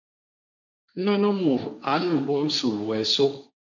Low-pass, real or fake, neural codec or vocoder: 7.2 kHz; fake; codec, 16 kHz, 1.1 kbps, Voila-Tokenizer